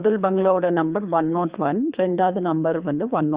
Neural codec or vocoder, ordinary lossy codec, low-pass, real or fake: codec, 24 kHz, 3 kbps, HILCodec; Opus, 64 kbps; 3.6 kHz; fake